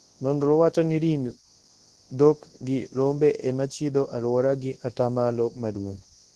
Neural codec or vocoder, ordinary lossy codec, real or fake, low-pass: codec, 24 kHz, 0.9 kbps, WavTokenizer, large speech release; Opus, 16 kbps; fake; 10.8 kHz